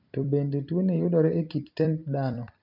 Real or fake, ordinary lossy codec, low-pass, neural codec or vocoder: fake; none; 5.4 kHz; vocoder, 44.1 kHz, 128 mel bands every 256 samples, BigVGAN v2